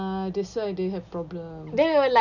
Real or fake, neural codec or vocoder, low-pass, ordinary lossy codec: real; none; 7.2 kHz; none